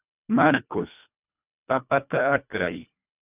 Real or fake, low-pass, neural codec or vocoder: fake; 3.6 kHz; codec, 24 kHz, 1.5 kbps, HILCodec